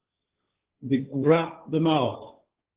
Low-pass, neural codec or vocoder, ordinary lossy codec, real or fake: 3.6 kHz; codec, 16 kHz, 1.1 kbps, Voila-Tokenizer; Opus, 16 kbps; fake